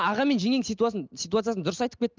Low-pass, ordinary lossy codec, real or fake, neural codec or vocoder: 7.2 kHz; Opus, 24 kbps; real; none